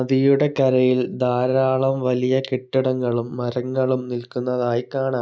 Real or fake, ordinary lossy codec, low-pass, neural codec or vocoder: real; none; none; none